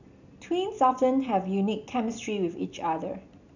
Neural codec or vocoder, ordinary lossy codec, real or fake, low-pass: none; none; real; 7.2 kHz